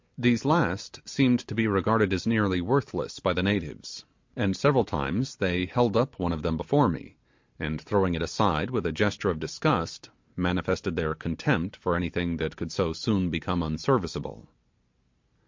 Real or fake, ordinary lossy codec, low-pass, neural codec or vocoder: real; MP3, 48 kbps; 7.2 kHz; none